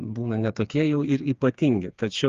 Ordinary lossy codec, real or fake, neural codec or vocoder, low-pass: Opus, 32 kbps; fake; codec, 16 kHz, 4 kbps, FreqCodec, smaller model; 7.2 kHz